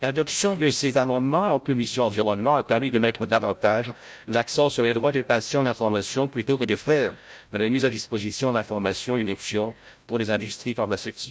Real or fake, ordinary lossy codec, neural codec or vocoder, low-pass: fake; none; codec, 16 kHz, 0.5 kbps, FreqCodec, larger model; none